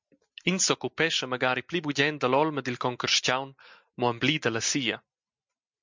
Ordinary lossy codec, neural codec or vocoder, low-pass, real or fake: MP3, 64 kbps; none; 7.2 kHz; real